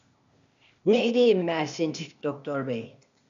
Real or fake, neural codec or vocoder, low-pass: fake; codec, 16 kHz, 0.8 kbps, ZipCodec; 7.2 kHz